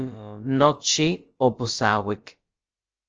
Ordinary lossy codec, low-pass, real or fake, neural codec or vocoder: Opus, 24 kbps; 7.2 kHz; fake; codec, 16 kHz, about 1 kbps, DyCAST, with the encoder's durations